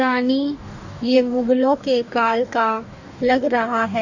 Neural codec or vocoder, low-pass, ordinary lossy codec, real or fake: codec, 44.1 kHz, 2.6 kbps, DAC; 7.2 kHz; none; fake